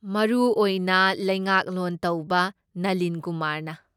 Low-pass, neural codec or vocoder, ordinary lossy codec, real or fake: 19.8 kHz; none; none; real